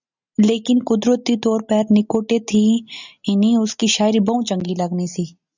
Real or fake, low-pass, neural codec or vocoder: real; 7.2 kHz; none